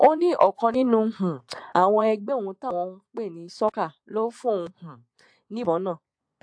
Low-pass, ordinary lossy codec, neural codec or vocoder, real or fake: 9.9 kHz; AAC, 64 kbps; vocoder, 24 kHz, 100 mel bands, Vocos; fake